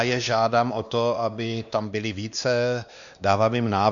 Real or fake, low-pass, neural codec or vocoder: fake; 7.2 kHz; codec, 16 kHz, 2 kbps, X-Codec, WavLM features, trained on Multilingual LibriSpeech